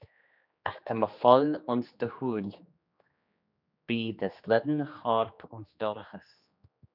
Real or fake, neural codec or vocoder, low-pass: fake; codec, 16 kHz, 2 kbps, X-Codec, HuBERT features, trained on general audio; 5.4 kHz